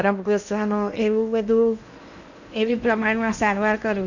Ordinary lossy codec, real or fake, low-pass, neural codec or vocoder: none; fake; 7.2 kHz; codec, 16 kHz in and 24 kHz out, 0.8 kbps, FocalCodec, streaming, 65536 codes